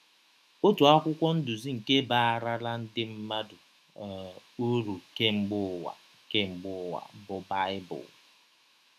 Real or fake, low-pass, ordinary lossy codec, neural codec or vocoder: fake; 14.4 kHz; none; autoencoder, 48 kHz, 128 numbers a frame, DAC-VAE, trained on Japanese speech